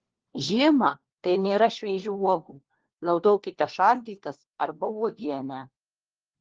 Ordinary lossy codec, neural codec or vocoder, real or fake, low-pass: Opus, 16 kbps; codec, 16 kHz, 1 kbps, FunCodec, trained on LibriTTS, 50 frames a second; fake; 7.2 kHz